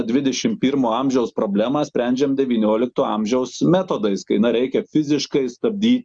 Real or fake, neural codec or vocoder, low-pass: real; none; 9.9 kHz